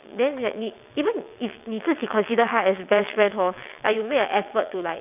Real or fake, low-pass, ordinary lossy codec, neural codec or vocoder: fake; 3.6 kHz; none; vocoder, 22.05 kHz, 80 mel bands, WaveNeXt